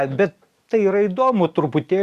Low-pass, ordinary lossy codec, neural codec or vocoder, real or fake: 14.4 kHz; Opus, 64 kbps; none; real